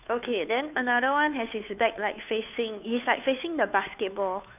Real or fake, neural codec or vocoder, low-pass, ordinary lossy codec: fake; codec, 16 kHz, 2 kbps, FunCodec, trained on Chinese and English, 25 frames a second; 3.6 kHz; none